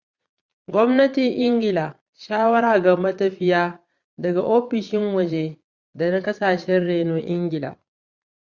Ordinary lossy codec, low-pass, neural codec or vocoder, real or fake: Opus, 64 kbps; 7.2 kHz; vocoder, 22.05 kHz, 80 mel bands, Vocos; fake